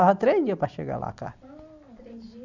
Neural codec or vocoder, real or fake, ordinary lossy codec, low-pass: none; real; none; 7.2 kHz